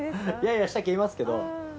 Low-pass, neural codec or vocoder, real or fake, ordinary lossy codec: none; none; real; none